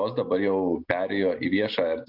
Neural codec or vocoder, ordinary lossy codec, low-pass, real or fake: none; AAC, 48 kbps; 5.4 kHz; real